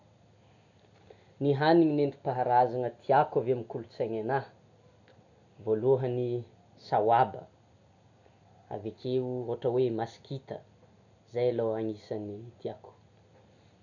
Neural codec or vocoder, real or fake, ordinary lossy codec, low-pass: none; real; none; 7.2 kHz